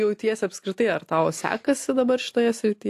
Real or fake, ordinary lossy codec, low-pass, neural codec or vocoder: real; AAC, 64 kbps; 14.4 kHz; none